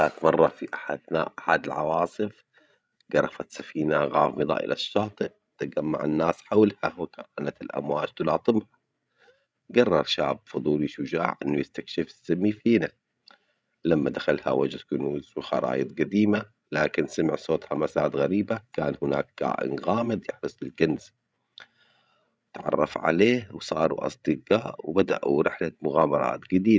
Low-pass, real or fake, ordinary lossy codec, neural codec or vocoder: none; fake; none; codec, 16 kHz, 16 kbps, FreqCodec, larger model